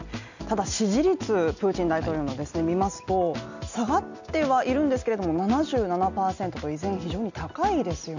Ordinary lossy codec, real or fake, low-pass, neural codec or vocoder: none; real; 7.2 kHz; none